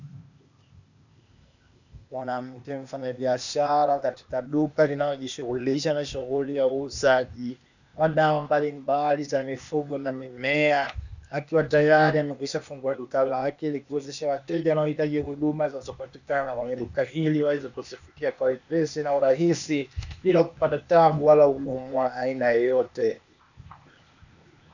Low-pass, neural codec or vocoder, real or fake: 7.2 kHz; codec, 16 kHz, 0.8 kbps, ZipCodec; fake